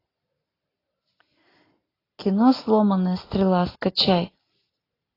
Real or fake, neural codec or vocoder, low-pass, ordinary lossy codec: real; none; 5.4 kHz; AAC, 24 kbps